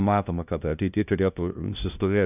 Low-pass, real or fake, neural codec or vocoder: 3.6 kHz; fake; codec, 16 kHz, 0.5 kbps, FunCodec, trained on LibriTTS, 25 frames a second